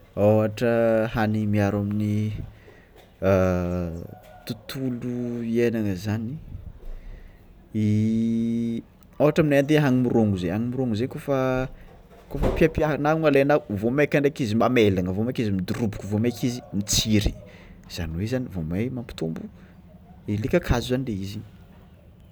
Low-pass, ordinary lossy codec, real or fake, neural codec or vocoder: none; none; real; none